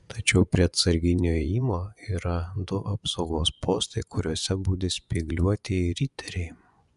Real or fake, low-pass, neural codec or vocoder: fake; 10.8 kHz; vocoder, 24 kHz, 100 mel bands, Vocos